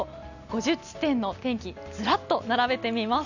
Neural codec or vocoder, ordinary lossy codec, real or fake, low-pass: none; none; real; 7.2 kHz